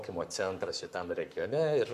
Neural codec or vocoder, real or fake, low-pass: codec, 44.1 kHz, 7.8 kbps, Pupu-Codec; fake; 14.4 kHz